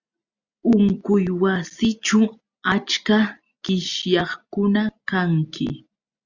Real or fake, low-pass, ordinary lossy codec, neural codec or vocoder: real; 7.2 kHz; Opus, 64 kbps; none